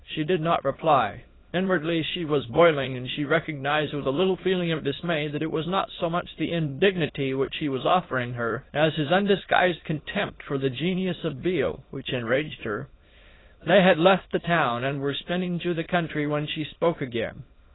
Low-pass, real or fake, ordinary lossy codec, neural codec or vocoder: 7.2 kHz; fake; AAC, 16 kbps; autoencoder, 22.05 kHz, a latent of 192 numbers a frame, VITS, trained on many speakers